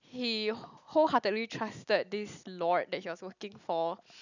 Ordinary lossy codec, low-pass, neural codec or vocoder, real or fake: none; 7.2 kHz; none; real